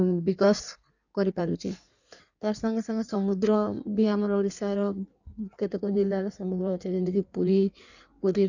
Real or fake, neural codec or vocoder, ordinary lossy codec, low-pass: fake; codec, 16 kHz in and 24 kHz out, 1.1 kbps, FireRedTTS-2 codec; none; 7.2 kHz